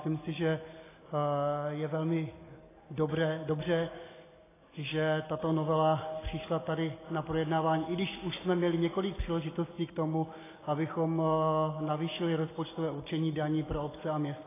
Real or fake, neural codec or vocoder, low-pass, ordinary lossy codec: real; none; 3.6 kHz; AAC, 16 kbps